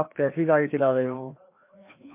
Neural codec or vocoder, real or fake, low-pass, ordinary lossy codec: codec, 16 kHz, 2 kbps, FreqCodec, larger model; fake; 3.6 kHz; MP3, 24 kbps